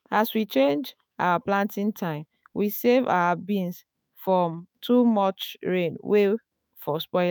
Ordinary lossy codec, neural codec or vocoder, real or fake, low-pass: none; autoencoder, 48 kHz, 128 numbers a frame, DAC-VAE, trained on Japanese speech; fake; none